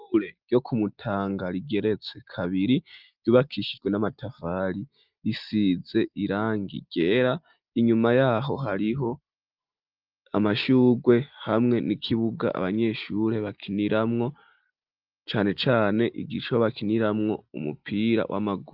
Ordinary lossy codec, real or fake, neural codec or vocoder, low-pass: Opus, 24 kbps; real; none; 5.4 kHz